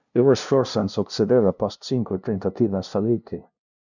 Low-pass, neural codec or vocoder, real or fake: 7.2 kHz; codec, 16 kHz, 0.5 kbps, FunCodec, trained on LibriTTS, 25 frames a second; fake